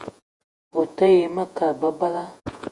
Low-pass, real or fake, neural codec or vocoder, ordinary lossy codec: 10.8 kHz; fake; vocoder, 48 kHz, 128 mel bands, Vocos; Opus, 64 kbps